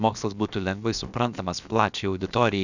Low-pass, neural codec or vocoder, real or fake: 7.2 kHz; codec, 16 kHz, about 1 kbps, DyCAST, with the encoder's durations; fake